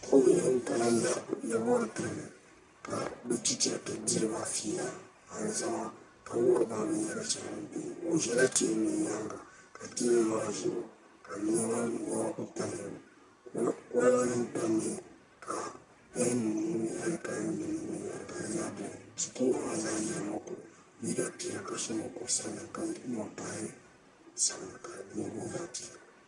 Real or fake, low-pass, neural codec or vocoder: fake; 10.8 kHz; codec, 44.1 kHz, 1.7 kbps, Pupu-Codec